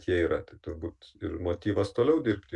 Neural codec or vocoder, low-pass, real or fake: none; 10.8 kHz; real